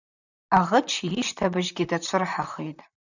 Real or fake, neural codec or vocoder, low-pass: fake; vocoder, 44.1 kHz, 128 mel bands, Pupu-Vocoder; 7.2 kHz